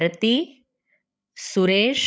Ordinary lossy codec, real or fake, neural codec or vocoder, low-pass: none; fake; codec, 16 kHz, 16 kbps, FreqCodec, larger model; none